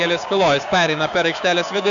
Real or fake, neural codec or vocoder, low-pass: fake; codec, 16 kHz, 6 kbps, DAC; 7.2 kHz